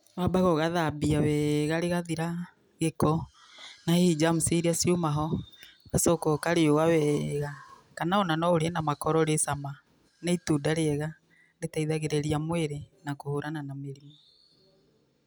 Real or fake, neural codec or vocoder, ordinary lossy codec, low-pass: real; none; none; none